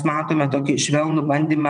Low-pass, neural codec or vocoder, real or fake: 9.9 kHz; vocoder, 22.05 kHz, 80 mel bands, WaveNeXt; fake